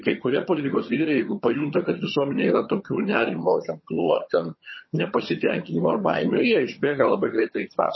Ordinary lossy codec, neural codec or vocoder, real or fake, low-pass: MP3, 24 kbps; vocoder, 22.05 kHz, 80 mel bands, HiFi-GAN; fake; 7.2 kHz